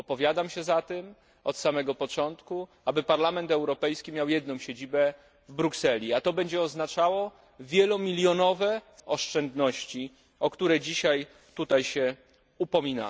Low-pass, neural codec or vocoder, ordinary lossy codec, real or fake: none; none; none; real